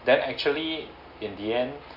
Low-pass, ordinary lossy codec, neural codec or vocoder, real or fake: 5.4 kHz; AAC, 48 kbps; none; real